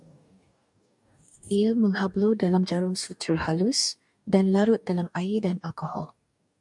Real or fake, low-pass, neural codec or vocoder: fake; 10.8 kHz; codec, 44.1 kHz, 2.6 kbps, DAC